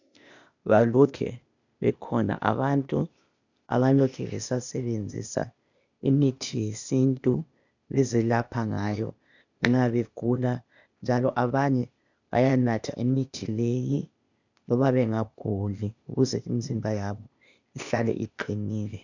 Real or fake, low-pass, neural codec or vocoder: fake; 7.2 kHz; codec, 16 kHz, 0.8 kbps, ZipCodec